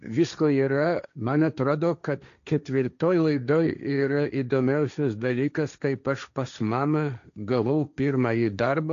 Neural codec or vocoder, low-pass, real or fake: codec, 16 kHz, 1.1 kbps, Voila-Tokenizer; 7.2 kHz; fake